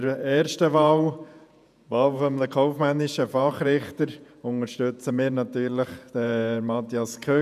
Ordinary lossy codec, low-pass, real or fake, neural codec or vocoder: none; 14.4 kHz; fake; vocoder, 48 kHz, 128 mel bands, Vocos